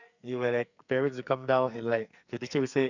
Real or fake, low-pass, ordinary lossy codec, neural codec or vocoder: fake; 7.2 kHz; none; codec, 44.1 kHz, 2.6 kbps, SNAC